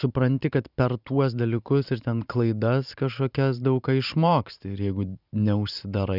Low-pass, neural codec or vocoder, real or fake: 5.4 kHz; vocoder, 44.1 kHz, 128 mel bands every 512 samples, BigVGAN v2; fake